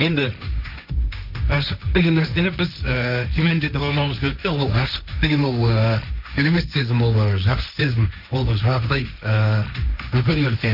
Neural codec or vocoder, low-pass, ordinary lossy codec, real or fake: codec, 16 kHz, 1.1 kbps, Voila-Tokenizer; 5.4 kHz; none; fake